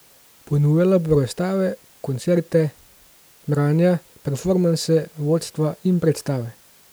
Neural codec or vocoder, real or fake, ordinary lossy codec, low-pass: none; real; none; none